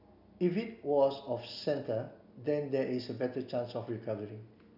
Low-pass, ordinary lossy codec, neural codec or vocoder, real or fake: 5.4 kHz; none; none; real